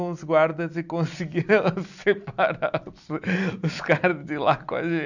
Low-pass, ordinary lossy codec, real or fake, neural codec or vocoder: 7.2 kHz; none; real; none